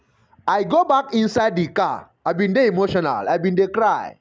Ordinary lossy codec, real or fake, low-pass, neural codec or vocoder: none; real; none; none